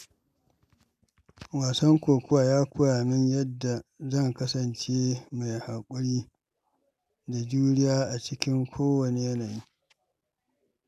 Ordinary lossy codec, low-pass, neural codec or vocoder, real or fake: none; 14.4 kHz; none; real